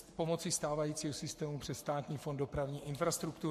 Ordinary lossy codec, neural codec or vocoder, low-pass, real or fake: MP3, 64 kbps; codec, 44.1 kHz, 7.8 kbps, Pupu-Codec; 14.4 kHz; fake